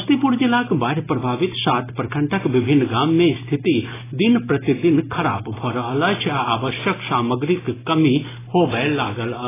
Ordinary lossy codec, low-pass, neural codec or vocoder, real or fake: AAC, 16 kbps; 3.6 kHz; none; real